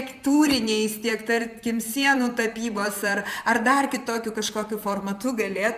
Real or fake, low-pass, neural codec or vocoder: fake; 14.4 kHz; vocoder, 44.1 kHz, 128 mel bands, Pupu-Vocoder